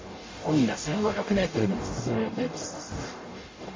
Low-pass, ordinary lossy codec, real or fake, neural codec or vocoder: 7.2 kHz; MP3, 32 kbps; fake; codec, 44.1 kHz, 0.9 kbps, DAC